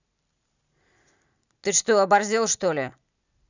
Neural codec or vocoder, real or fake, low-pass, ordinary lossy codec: none; real; 7.2 kHz; none